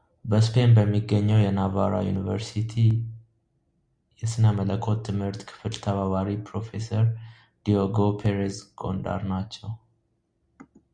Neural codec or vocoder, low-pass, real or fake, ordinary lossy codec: none; 9.9 kHz; real; Opus, 64 kbps